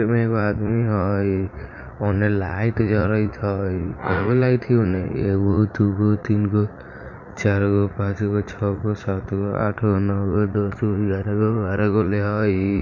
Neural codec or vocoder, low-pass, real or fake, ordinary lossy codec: vocoder, 44.1 kHz, 80 mel bands, Vocos; 7.2 kHz; fake; none